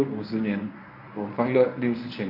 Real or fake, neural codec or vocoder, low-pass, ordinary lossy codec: fake; codec, 24 kHz, 0.9 kbps, WavTokenizer, medium speech release version 1; 5.4 kHz; none